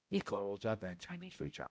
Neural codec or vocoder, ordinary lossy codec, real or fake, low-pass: codec, 16 kHz, 0.5 kbps, X-Codec, HuBERT features, trained on balanced general audio; none; fake; none